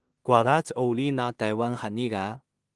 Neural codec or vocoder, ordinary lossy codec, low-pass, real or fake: codec, 16 kHz in and 24 kHz out, 0.4 kbps, LongCat-Audio-Codec, two codebook decoder; Opus, 24 kbps; 10.8 kHz; fake